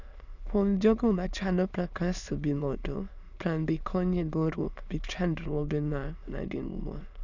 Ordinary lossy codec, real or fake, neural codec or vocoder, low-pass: none; fake; autoencoder, 22.05 kHz, a latent of 192 numbers a frame, VITS, trained on many speakers; 7.2 kHz